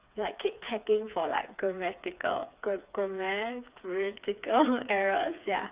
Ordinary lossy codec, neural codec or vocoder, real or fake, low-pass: Opus, 64 kbps; codec, 16 kHz, 4 kbps, FreqCodec, smaller model; fake; 3.6 kHz